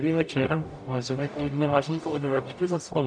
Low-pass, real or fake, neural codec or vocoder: 9.9 kHz; fake; codec, 44.1 kHz, 0.9 kbps, DAC